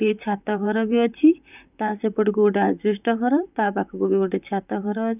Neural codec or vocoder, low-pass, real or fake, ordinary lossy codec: vocoder, 44.1 kHz, 128 mel bands, Pupu-Vocoder; 3.6 kHz; fake; none